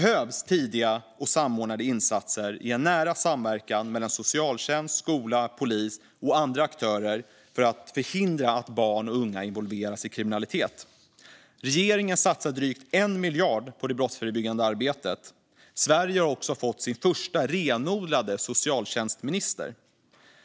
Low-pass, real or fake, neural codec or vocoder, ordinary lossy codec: none; real; none; none